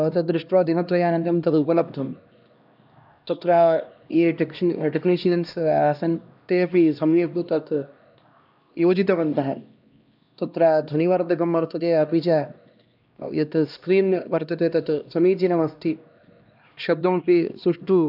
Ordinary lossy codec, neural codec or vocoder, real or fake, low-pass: none; codec, 16 kHz, 1 kbps, X-Codec, HuBERT features, trained on LibriSpeech; fake; 5.4 kHz